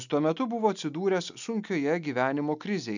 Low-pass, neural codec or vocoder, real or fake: 7.2 kHz; none; real